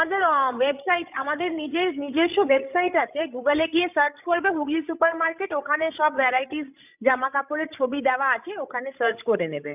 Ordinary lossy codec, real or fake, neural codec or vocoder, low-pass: none; fake; codec, 16 kHz, 16 kbps, FreqCodec, larger model; 3.6 kHz